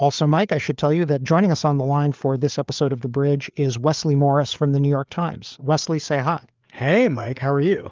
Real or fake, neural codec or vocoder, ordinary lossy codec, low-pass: fake; codec, 16 kHz, 4 kbps, FreqCodec, larger model; Opus, 24 kbps; 7.2 kHz